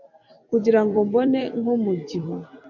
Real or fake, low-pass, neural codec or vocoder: fake; 7.2 kHz; vocoder, 44.1 kHz, 128 mel bands every 256 samples, BigVGAN v2